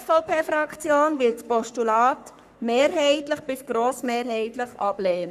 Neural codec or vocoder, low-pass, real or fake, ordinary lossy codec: codec, 44.1 kHz, 3.4 kbps, Pupu-Codec; 14.4 kHz; fake; none